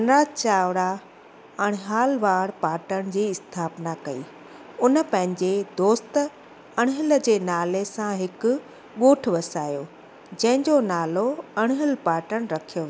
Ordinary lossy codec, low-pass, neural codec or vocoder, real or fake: none; none; none; real